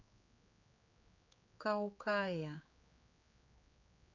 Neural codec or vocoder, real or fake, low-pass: codec, 16 kHz, 4 kbps, X-Codec, HuBERT features, trained on general audio; fake; 7.2 kHz